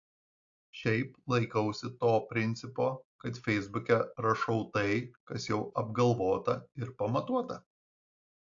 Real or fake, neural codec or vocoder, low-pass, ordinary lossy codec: real; none; 7.2 kHz; AAC, 48 kbps